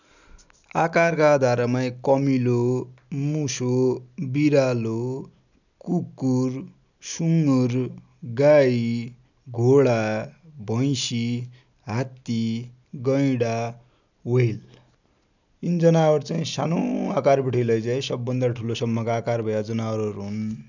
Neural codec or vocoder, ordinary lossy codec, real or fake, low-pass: none; none; real; 7.2 kHz